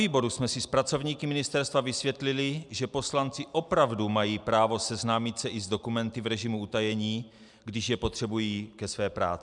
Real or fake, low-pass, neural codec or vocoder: real; 10.8 kHz; none